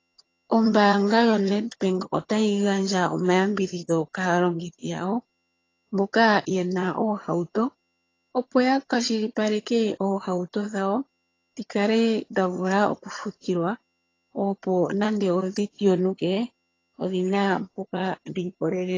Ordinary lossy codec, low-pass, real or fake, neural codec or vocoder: AAC, 32 kbps; 7.2 kHz; fake; vocoder, 22.05 kHz, 80 mel bands, HiFi-GAN